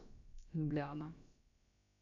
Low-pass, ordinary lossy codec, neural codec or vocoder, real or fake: 7.2 kHz; none; codec, 16 kHz, about 1 kbps, DyCAST, with the encoder's durations; fake